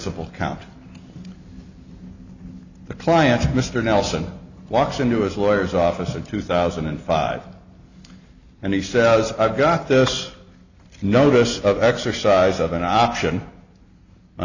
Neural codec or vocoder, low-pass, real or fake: none; 7.2 kHz; real